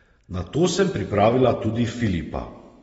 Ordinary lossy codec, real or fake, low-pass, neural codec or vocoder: AAC, 24 kbps; real; 9.9 kHz; none